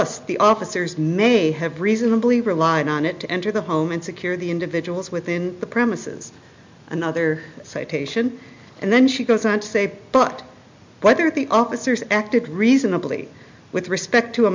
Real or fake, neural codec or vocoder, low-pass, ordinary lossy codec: real; none; 7.2 kHz; MP3, 64 kbps